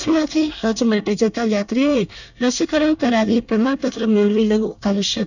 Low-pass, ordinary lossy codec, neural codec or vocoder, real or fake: 7.2 kHz; none; codec, 24 kHz, 1 kbps, SNAC; fake